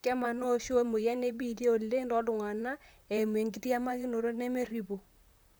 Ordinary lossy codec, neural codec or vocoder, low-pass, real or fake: none; vocoder, 44.1 kHz, 128 mel bands, Pupu-Vocoder; none; fake